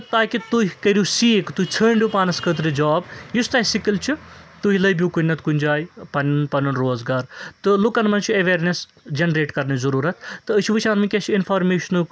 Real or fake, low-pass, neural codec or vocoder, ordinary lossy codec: real; none; none; none